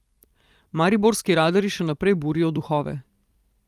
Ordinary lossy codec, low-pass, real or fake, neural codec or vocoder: Opus, 32 kbps; 14.4 kHz; fake; vocoder, 44.1 kHz, 128 mel bands every 256 samples, BigVGAN v2